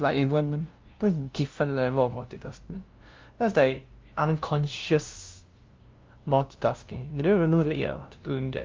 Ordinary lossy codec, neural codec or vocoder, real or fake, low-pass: Opus, 32 kbps; codec, 16 kHz, 0.5 kbps, FunCodec, trained on LibriTTS, 25 frames a second; fake; 7.2 kHz